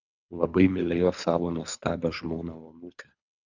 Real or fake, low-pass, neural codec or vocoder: fake; 7.2 kHz; codec, 24 kHz, 3 kbps, HILCodec